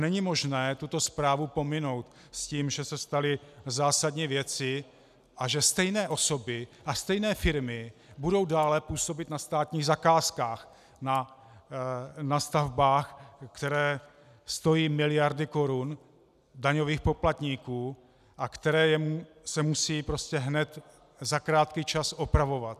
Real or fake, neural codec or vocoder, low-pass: real; none; 14.4 kHz